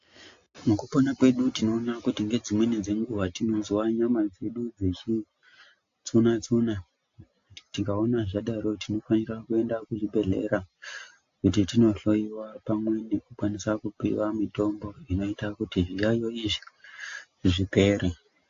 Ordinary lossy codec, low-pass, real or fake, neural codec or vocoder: AAC, 48 kbps; 7.2 kHz; real; none